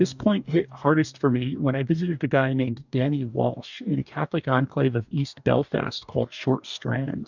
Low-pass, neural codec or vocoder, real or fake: 7.2 kHz; codec, 44.1 kHz, 2.6 kbps, DAC; fake